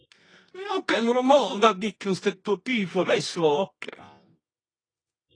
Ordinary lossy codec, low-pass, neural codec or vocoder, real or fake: AAC, 32 kbps; 9.9 kHz; codec, 24 kHz, 0.9 kbps, WavTokenizer, medium music audio release; fake